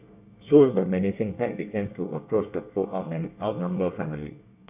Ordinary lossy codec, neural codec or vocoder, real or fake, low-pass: none; codec, 24 kHz, 1 kbps, SNAC; fake; 3.6 kHz